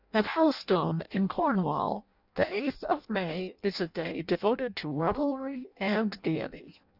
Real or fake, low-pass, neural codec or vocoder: fake; 5.4 kHz; codec, 16 kHz in and 24 kHz out, 0.6 kbps, FireRedTTS-2 codec